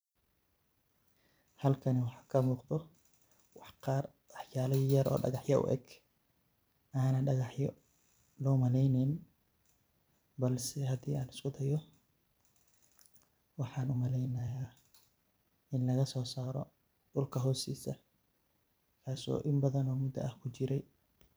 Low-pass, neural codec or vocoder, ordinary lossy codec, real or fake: none; vocoder, 44.1 kHz, 128 mel bands every 256 samples, BigVGAN v2; none; fake